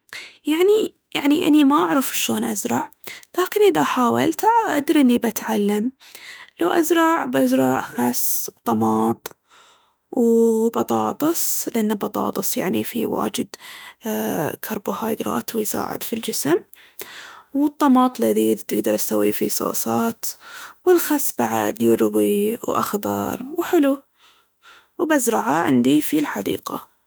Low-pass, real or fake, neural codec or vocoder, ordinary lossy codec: none; fake; autoencoder, 48 kHz, 32 numbers a frame, DAC-VAE, trained on Japanese speech; none